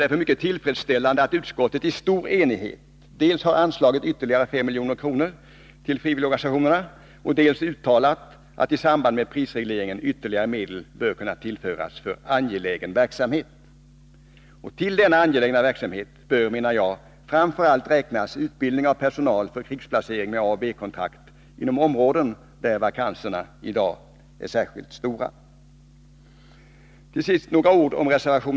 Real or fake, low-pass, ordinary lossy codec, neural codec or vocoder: real; none; none; none